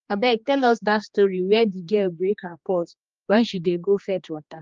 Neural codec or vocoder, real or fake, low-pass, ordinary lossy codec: codec, 16 kHz, 2 kbps, X-Codec, HuBERT features, trained on general audio; fake; 7.2 kHz; Opus, 24 kbps